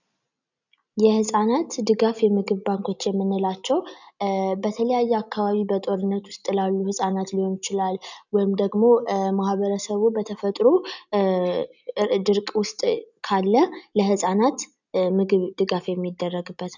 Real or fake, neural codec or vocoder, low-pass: real; none; 7.2 kHz